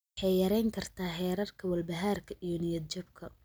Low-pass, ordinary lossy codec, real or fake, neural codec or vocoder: none; none; real; none